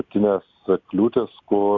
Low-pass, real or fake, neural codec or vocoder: 7.2 kHz; real; none